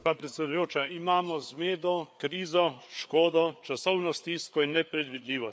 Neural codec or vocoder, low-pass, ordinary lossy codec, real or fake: codec, 16 kHz, 4 kbps, FreqCodec, larger model; none; none; fake